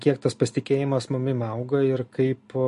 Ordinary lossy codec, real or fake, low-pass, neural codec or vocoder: MP3, 48 kbps; real; 10.8 kHz; none